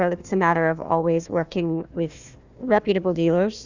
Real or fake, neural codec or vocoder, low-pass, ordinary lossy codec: fake; codec, 16 kHz, 1 kbps, FunCodec, trained on Chinese and English, 50 frames a second; 7.2 kHz; Opus, 64 kbps